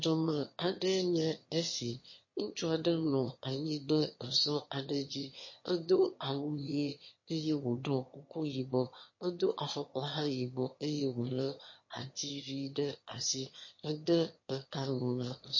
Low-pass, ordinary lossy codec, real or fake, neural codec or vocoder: 7.2 kHz; MP3, 32 kbps; fake; autoencoder, 22.05 kHz, a latent of 192 numbers a frame, VITS, trained on one speaker